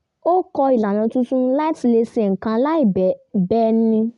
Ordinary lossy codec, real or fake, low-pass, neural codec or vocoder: none; real; 10.8 kHz; none